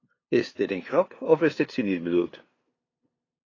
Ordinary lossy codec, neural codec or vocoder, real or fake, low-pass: AAC, 32 kbps; codec, 16 kHz, 2 kbps, FunCodec, trained on LibriTTS, 25 frames a second; fake; 7.2 kHz